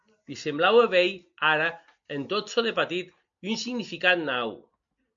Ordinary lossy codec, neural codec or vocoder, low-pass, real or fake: MP3, 64 kbps; none; 7.2 kHz; real